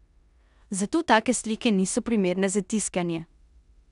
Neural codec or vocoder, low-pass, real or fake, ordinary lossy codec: codec, 16 kHz in and 24 kHz out, 0.9 kbps, LongCat-Audio-Codec, four codebook decoder; 10.8 kHz; fake; none